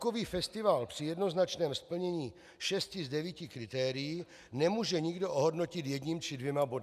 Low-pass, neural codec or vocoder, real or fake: 14.4 kHz; none; real